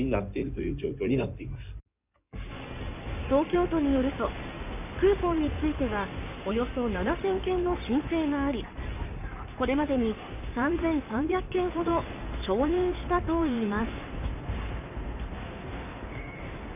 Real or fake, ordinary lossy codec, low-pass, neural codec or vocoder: fake; none; 3.6 kHz; codec, 16 kHz in and 24 kHz out, 2.2 kbps, FireRedTTS-2 codec